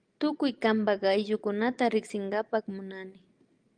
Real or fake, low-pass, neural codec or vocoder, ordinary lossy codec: real; 9.9 kHz; none; Opus, 32 kbps